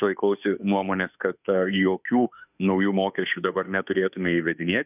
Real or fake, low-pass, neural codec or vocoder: fake; 3.6 kHz; autoencoder, 48 kHz, 32 numbers a frame, DAC-VAE, trained on Japanese speech